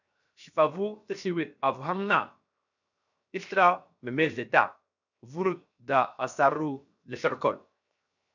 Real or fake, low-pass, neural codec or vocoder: fake; 7.2 kHz; codec, 16 kHz, 0.7 kbps, FocalCodec